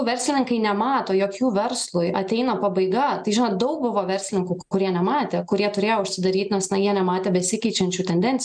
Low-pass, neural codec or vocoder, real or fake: 9.9 kHz; none; real